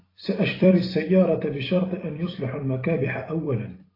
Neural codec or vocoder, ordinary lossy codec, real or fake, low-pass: vocoder, 44.1 kHz, 128 mel bands every 256 samples, BigVGAN v2; AAC, 24 kbps; fake; 5.4 kHz